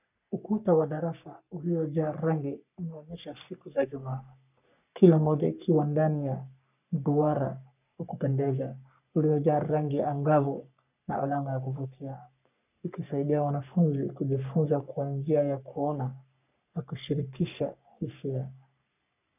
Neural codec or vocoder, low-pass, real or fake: codec, 44.1 kHz, 3.4 kbps, Pupu-Codec; 3.6 kHz; fake